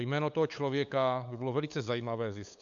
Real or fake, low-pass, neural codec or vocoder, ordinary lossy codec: fake; 7.2 kHz; codec, 16 kHz, 8 kbps, FunCodec, trained on LibriTTS, 25 frames a second; AAC, 64 kbps